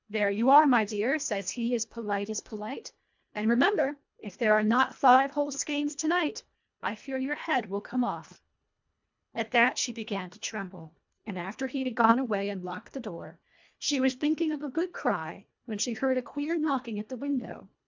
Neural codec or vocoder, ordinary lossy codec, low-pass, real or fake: codec, 24 kHz, 1.5 kbps, HILCodec; MP3, 64 kbps; 7.2 kHz; fake